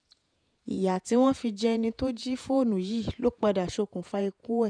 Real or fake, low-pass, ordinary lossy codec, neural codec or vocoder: fake; 9.9 kHz; none; vocoder, 48 kHz, 128 mel bands, Vocos